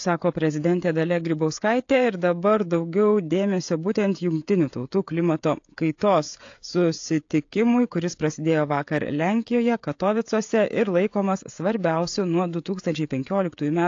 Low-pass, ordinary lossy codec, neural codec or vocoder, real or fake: 7.2 kHz; AAC, 48 kbps; codec, 16 kHz, 16 kbps, FreqCodec, smaller model; fake